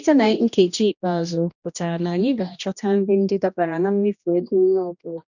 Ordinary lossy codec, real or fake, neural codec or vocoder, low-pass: none; fake; codec, 16 kHz, 1 kbps, X-Codec, HuBERT features, trained on general audio; 7.2 kHz